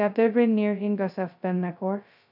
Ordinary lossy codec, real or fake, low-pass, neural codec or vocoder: none; fake; 5.4 kHz; codec, 16 kHz, 0.2 kbps, FocalCodec